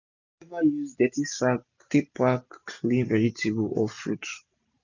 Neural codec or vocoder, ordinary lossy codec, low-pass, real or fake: none; none; 7.2 kHz; real